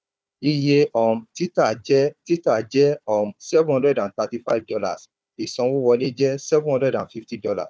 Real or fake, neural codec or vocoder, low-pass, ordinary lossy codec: fake; codec, 16 kHz, 4 kbps, FunCodec, trained on Chinese and English, 50 frames a second; none; none